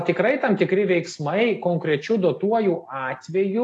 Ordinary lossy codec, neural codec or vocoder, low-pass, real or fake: AAC, 48 kbps; none; 10.8 kHz; real